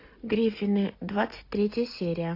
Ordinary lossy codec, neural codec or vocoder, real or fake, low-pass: AAC, 32 kbps; none; real; 5.4 kHz